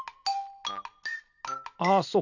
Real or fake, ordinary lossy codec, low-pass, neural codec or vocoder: real; none; 7.2 kHz; none